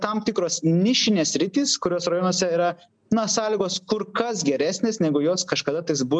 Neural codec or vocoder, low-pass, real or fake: none; 9.9 kHz; real